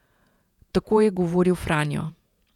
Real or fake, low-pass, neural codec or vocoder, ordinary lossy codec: fake; 19.8 kHz; vocoder, 48 kHz, 128 mel bands, Vocos; none